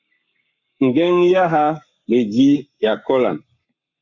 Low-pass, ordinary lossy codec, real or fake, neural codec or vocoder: 7.2 kHz; Opus, 64 kbps; fake; codec, 44.1 kHz, 7.8 kbps, Pupu-Codec